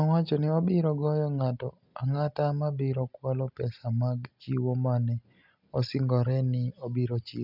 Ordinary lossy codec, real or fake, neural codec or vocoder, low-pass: none; real; none; 5.4 kHz